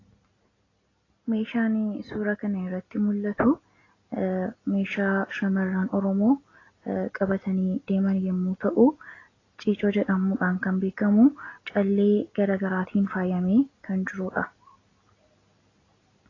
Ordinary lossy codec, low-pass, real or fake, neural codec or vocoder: AAC, 32 kbps; 7.2 kHz; real; none